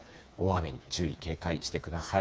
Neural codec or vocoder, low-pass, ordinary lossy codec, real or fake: codec, 16 kHz, 4 kbps, FreqCodec, smaller model; none; none; fake